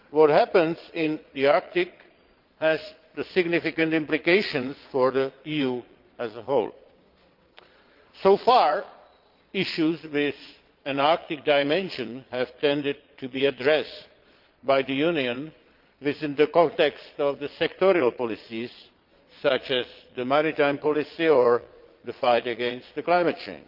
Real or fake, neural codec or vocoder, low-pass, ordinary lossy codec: fake; vocoder, 22.05 kHz, 80 mel bands, Vocos; 5.4 kHz; Opus, 24 kbps